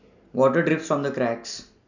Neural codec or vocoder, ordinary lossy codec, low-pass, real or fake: none; none; 7.2 kHz; real